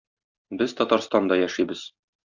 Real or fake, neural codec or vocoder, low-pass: real; none; 7.2 kHz